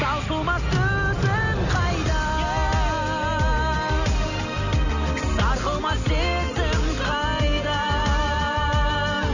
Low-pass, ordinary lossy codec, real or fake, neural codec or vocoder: 7.2 kHz; none; real; none